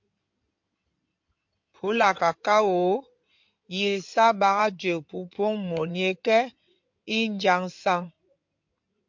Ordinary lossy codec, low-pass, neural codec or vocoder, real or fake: MP3, 48 kbps; 7.2 kHz; codec, 16 kHz in and 24 kHz out, 2.2 kbps, FireRedTTS-2 codec; fake